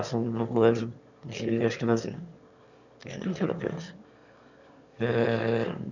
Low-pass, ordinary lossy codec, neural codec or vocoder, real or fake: 7.2 kHz; none; autoencoder, 22.05 kHz, a latent of 192 numbers a frame, VITS, trained on one speaker; fake